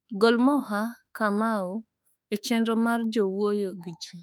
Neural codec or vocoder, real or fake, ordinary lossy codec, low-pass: autoencoder, 48 kHz, 32 numbers a frame, DAC-VAE, trained on Japanese speech; fake; none; 19.8 kHz